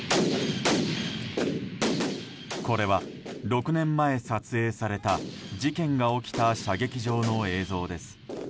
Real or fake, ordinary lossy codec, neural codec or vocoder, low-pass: real; none; none; none